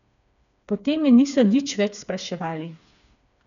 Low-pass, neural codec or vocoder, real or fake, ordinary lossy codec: 7.2 kHz; codec, 16 kHz, 4 kbps, FreqCodec, smaller model; fake; none